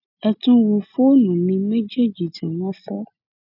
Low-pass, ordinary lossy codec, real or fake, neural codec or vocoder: 5.4 kHz; none; real; none